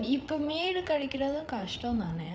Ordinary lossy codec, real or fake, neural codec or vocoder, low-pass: none; fake; codec, 16 kHz, 8 kbps, FreqCodec, larger model; none